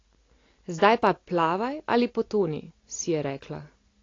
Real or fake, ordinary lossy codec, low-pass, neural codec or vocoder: real; AAC, 32 kbps; 7.2 kHz; none